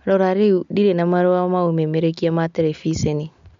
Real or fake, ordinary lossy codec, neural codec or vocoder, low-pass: real; MP3, 64 kbps; none; 7.2 kHz